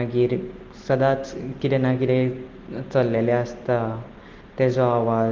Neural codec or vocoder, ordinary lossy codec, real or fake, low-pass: none; Opus, 16 kbps; real; 7.2 kHz